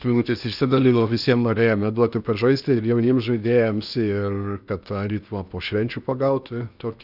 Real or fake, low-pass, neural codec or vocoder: fake; 5.4 kHz; codec, 16 kHz in and 24 kHz out, 0.8 kbps, FocalCodec, streaming, 65536 codes